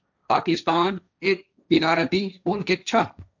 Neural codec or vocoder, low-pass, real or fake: codec, 16 kHz, 1.1 kbps, Voila-Tokenizer; 7.2 kHz; fake